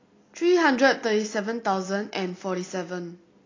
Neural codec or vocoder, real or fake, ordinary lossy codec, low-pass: none; real; AAC, 32 kbps; 7.2 kHz